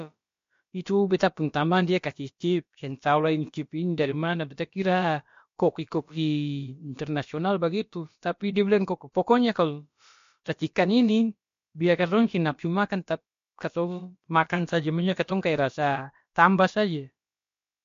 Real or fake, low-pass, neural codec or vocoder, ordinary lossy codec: fake; 7.2 kHz; codec, 16 kHz, about 1 kbps, DyCAST, with the encoder's durations; MP3, 48 kbps